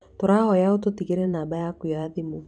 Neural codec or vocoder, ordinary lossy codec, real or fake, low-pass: vocoder, 44.1 kHz, 128 mel bands every 512 samples, BigVGAN v2; none; fake; 9.9 kHz